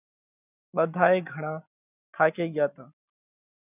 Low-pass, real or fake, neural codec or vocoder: 3.6 kHz; real; none